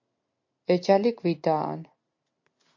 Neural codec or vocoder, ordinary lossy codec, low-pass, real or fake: none; MP3, 48 kbps; 7.2 kHz; real